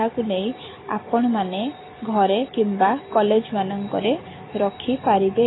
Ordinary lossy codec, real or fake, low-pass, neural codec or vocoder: AAC, 16 kbps; fake; 7.2 kHz; vocoder, 44.1 kHz, 128 mel bands every 256 samples, BigVGAN v2